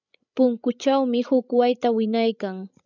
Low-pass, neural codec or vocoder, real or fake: 7.2 kHz; codec, 16 kHz, 16 kbps, FreqCodec, larger model; fake